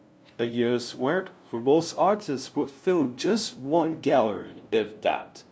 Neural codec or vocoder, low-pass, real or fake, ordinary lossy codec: codec, 16 kHz, 0.5 kbps, FunCodec, trained on LibriTTS, 25 frames a second; none; fake; none